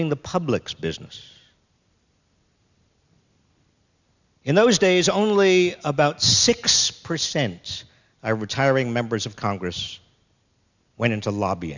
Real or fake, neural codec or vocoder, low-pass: real; none; 7.2 kHz